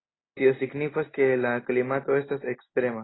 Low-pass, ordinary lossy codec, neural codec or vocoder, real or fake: 7.2 kHz; AAC, 16 kbps; none; real